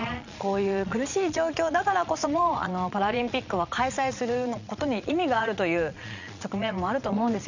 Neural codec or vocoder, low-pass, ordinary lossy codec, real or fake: vocoder, 22.05 kHz, 80 mel bands, Vocos; 7.2 kHz; none; fake